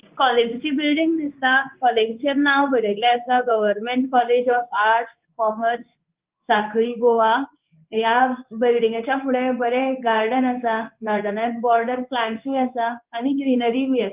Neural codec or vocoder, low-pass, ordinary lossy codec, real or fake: codec, 16 kHz in and 24 kHz out, 1 kbps, XY-Tokenizer; 3.6 kHz; Opus, 32 kbps; fake